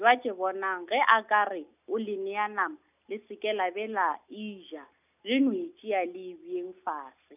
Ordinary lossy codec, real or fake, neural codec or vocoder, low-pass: none; real; none; 3.6 kHz